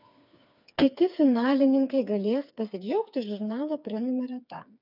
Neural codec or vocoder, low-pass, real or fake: codec, 16 kHz, 4 kbps, FreqCodec, smaller model; 5.4 kHz; fake